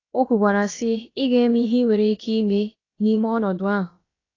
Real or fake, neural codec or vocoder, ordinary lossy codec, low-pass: fake; codec, 16 kHz, about 1 kbps, DyCAST, with the encoder's durations; AAC, 32 kbps; 7.2 kHz